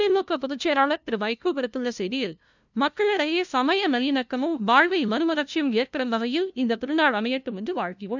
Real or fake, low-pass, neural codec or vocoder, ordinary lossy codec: fake; 7.2 kHz; codec, 16 kHz, 0.5 kbps, FunCodec, trained on LibriTTS, 25 frames a second; none